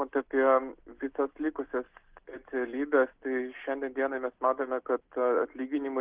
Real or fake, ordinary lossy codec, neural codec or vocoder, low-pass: real; Opus, 16 kbps; none; 3.6 kHz